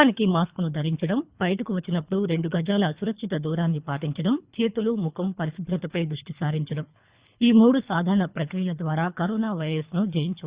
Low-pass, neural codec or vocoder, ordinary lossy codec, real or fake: 3.6 kHz; codec, 24 kHz, 3 kbps, HILCodec; Opus, 64 kbps; fake